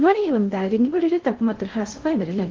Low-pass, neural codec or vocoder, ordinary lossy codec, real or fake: 7.2 kHz; codec, 16 kHz in and 24 kHz out, 0.6 kbps, FocalCodec, streaming, 2048 codes; Opus, 16 kbps; fake